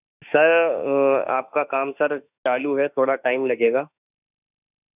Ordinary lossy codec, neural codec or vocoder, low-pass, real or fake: none; autoencoder, 48 kHz, 32 numbers a frame, DAC-VAE, trained on Japanese speech; 3.6 kHz; fake